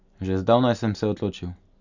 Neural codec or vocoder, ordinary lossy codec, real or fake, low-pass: none; none; real; 7.2 kHz